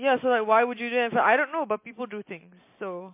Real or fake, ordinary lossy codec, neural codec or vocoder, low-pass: real; MP3, 24 kbps; none; 3.6 kHz